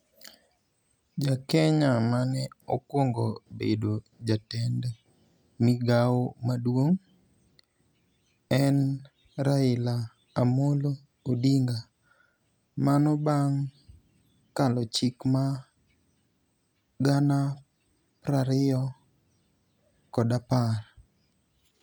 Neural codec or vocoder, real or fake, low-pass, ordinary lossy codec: none; real; none; none